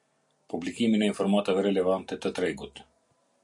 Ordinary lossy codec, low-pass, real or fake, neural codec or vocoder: MP3, 64 kbps; 10.8 kHz; real; none